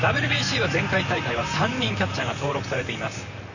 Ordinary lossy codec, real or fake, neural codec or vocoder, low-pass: none; fake; vocoder, 44.1 kHz, 128 mel bands, Pupu-Vocoder; 7.2 kHz